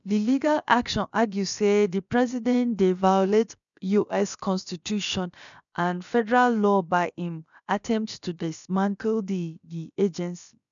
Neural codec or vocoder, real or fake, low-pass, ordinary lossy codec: codec, 16 kHz, about 1 kbps, DyCAST, with the encoder's durations; fake; 7.2 kHz; none